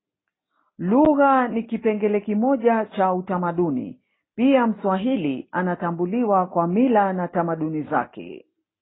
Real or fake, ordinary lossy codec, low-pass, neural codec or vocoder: real; AAC, 16 kbps; 7.2 kHz; none